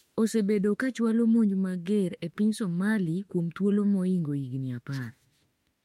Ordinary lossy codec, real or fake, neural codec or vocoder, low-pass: MP3, 64 kbps; fake; autoencoder, 48 kHz, 32 numbers a frame, DAC-VAE, trained on Japanese speech; 19.8 kHz